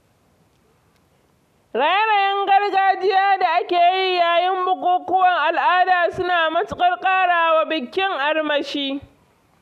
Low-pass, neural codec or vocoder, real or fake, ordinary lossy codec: 14.4 kHz; none; real; none